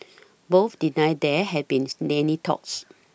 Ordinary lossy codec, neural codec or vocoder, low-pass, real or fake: none; none; none; real